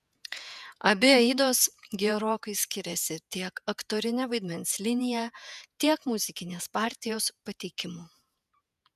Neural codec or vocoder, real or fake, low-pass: vocoder, 48 kHz, 128 mel bands, Vocos; fake; 14.4 kHz